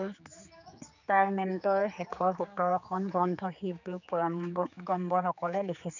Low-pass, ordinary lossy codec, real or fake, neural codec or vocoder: 7.2 kHz; none; fake; codec, 16 kHz, 4 kbps, X-Codec, HuBERT features, trained on general audio